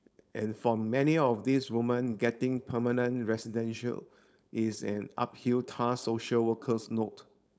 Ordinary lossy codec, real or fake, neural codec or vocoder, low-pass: none; fake; codec, 16 kHz, 4.8 kbps, FACodec; none